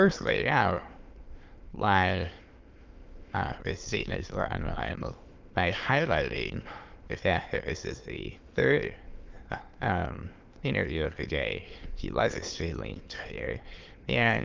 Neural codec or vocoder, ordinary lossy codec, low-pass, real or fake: autoencoder, 22.05 kHz, a latent of 192 numbers a frame, VITS, trained on many speakers; Opus, 32 kbps; 7.2 kHz; fake